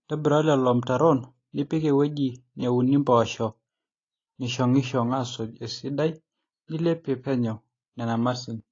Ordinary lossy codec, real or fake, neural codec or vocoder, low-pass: AAC, 32 kbps; real; none; 7.2 kHz